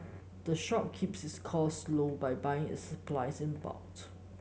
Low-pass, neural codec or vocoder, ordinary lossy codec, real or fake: none; none; none; real